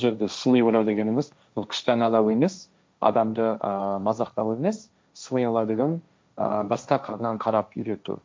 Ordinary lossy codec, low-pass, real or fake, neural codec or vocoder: none; none; fake; codec, 16 kHz, 1.1 kbps, Voila-Tokenizer